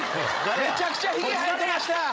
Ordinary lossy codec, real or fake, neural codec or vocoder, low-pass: none; fake; codec, 16 kHz, 16 kbps, FreqCodec, larger model; none